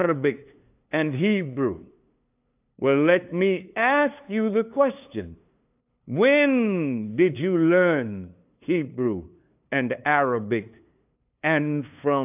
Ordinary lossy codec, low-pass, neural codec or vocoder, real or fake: AAC, 32 kbps; 3.6 kHz; codec, 16 kHz, 2 kbps, FunCodec, trained on LibriTTS, 25 frames a second; fake